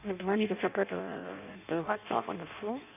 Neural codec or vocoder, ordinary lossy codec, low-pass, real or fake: codec, 16 kHz in and 24 kHz out, 0.6 kbps, FireRedTTS-2 codec; none; 3.6 kHz; fake